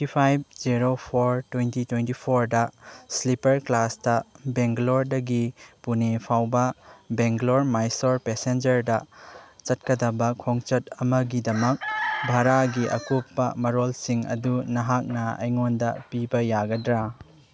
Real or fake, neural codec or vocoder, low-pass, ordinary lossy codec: real; none; none; none